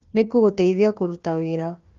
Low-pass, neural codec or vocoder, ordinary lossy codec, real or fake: 7.2 kHz; codec, 16 kHz, 1 kbps, FunCodec, trained on Chinese and English, 50 frames a second; Opus, 32 kbps; fake